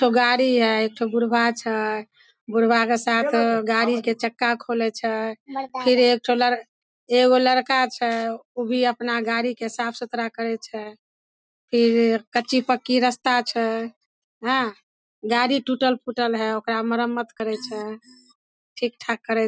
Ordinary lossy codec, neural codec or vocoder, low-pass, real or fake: none; none; none; real